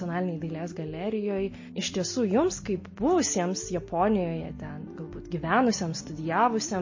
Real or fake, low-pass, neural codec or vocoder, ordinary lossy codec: real; 7.2 kHz; none; MP3, 32 kbps